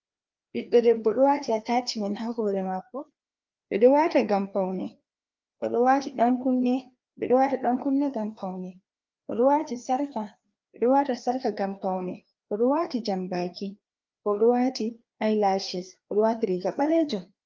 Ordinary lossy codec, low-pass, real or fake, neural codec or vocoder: Opus, 32 kbps; 7.2 kHz; fake; codec, 16 kHz, 2 kbps, FreqCodec, larger model